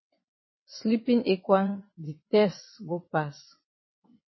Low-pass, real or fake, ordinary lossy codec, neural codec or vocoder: 7.2 kHz; fake; MP3, 24 kbps; vocoder, 22.05 kHz, 80 mel bands, WaveNeXt